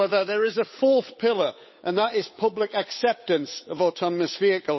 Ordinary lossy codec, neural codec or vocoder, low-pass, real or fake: MP3, 24 kbps; codec, 16 kHz, 8 kbps, FunCodec, trained on Chinese and English, 25 frames a second; 7.2 kHz; fake